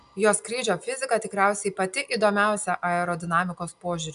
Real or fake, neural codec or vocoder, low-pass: real; none; 10.8 kHz